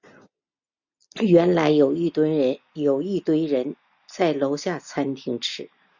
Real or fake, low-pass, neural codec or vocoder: real; 7.2 kHz; none